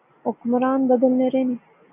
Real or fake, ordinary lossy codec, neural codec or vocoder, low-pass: real; AAC, 32 kbps; none; 3.6 kHz